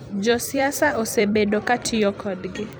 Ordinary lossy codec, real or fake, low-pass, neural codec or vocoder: none; fake; none; vocoder, 44.1 kHz, 128 mel bands every 256 samples, BigVGAN v2